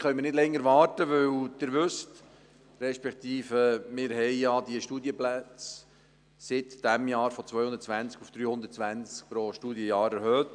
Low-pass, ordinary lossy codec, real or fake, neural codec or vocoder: 9.9 kHz; none; real; none